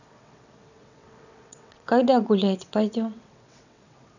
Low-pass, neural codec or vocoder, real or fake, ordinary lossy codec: 7.2 kHz; vocoder, 22.05 kHz, 80 mel bands, WaveNeXt; fake; none